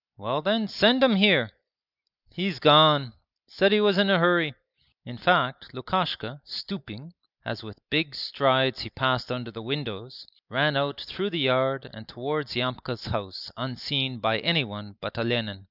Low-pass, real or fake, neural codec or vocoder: 5.4 kHz; real; none